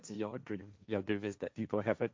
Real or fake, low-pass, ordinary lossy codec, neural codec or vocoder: fake; none; none; codec, 16 kHz, 1.1 kbps, Voila-Tokenizer